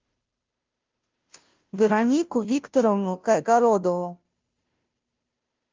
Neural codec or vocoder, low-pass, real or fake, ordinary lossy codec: codec, 16 kHz, 0.5 kbps, FunCodec, trained on Chinese and English, 25 frames a second; 7.2 kHz; fake; Opus, 32 kbps